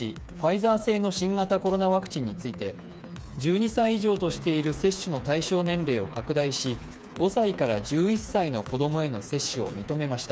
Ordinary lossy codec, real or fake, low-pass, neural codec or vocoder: none; fake; none; codec, 16 kHz, 4 kbps, FreqCodec, smaller model